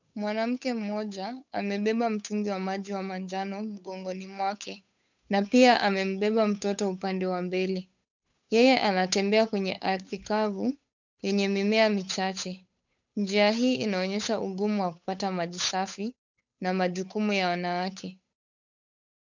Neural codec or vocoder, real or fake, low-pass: codec, 16 kHz, 8 kbps, FunCodec, trained on Chinese and English, 25 frames a second; fake; 7.2 kHz